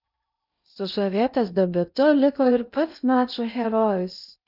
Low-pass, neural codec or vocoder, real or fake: 5.4 kHz; codec, 16 kHz in and 24 kHz out, 0.6 kbps, FocalCodec, streaming, 2048 codes; fake